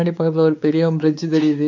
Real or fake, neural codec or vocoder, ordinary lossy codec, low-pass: fake; codec, 16 kHz, 4 kbps, X-Codec, WavLM features, trained on Multilingual LibriSpeech; none; 7.2 kHz